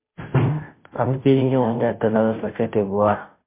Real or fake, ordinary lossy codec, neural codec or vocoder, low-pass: fake; MP3, 24 kbps; codec, 16 kHz, 0.5 kbps, FunCodec, trained on Chinese and English, 25 frames a second; 3.6 kHz